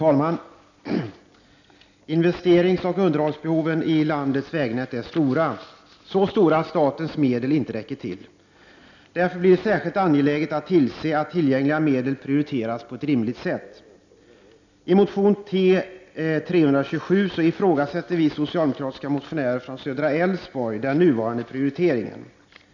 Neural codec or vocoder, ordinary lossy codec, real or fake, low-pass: none; none; real; 7.2 kHz